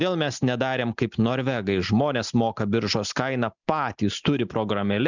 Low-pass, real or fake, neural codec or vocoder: 7.2 kHz; real; none